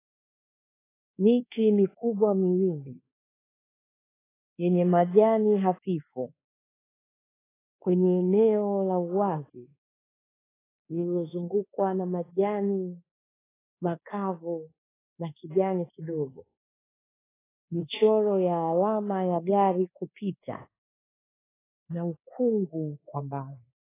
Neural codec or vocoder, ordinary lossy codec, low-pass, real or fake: codec, 24 kHz, 1.2 kbps, DualCodec; AAC, 16 kbps; 3.6 kHz; fake